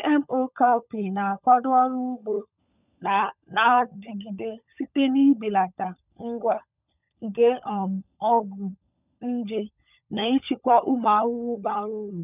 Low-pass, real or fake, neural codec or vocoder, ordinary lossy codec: 3.6 kHz; fake; codec, 16 kHz, 16 kbps, FunCodec, trained on LibriTTS, 50 frames a second; none